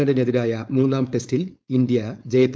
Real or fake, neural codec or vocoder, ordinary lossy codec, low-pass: fake; codec, 16 kHz, 4.8 kbps, FACodec; none; none